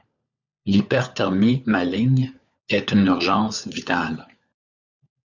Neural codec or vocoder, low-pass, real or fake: codec, 16 kHz, 4 kbps, FunCodec, trained on LibriTTS, 50 frames a second; 7.2 kHz; fake